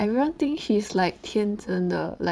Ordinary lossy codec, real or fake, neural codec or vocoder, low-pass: none; fake; vocoder, 22.05 kHz, 80 mel bands, Vocos; none